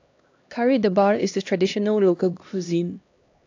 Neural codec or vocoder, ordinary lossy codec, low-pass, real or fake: codec, 16 kHz, 1 kbps, X-Codec, HuBERT features, trained on LibriSpeech; AAC, 48 kbps; 7.2 kHz; fake